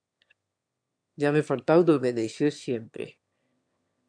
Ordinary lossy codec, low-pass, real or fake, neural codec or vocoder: AAC, 64 kbps; 9.9 kHz; fake; autoencoder, 22.05 kHz, a latent of 192 numbers a frame, VITS, trained on one speaker